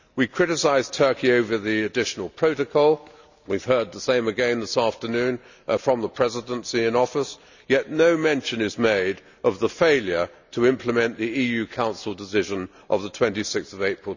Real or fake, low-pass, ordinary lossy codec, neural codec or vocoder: real; 7.2 kHz; none; none